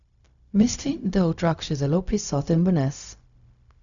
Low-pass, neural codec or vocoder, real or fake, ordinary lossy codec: 7.2 kHz; codec, 16 kHz, 0.4 kbps, LongCat-Audio-Codec; fake; none